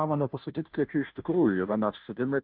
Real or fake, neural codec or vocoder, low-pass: fake; codec, 16 kHz, 0.5 kbps, FunCodec, trained on Chinese and English, 25 frames a second; 5.4 kHz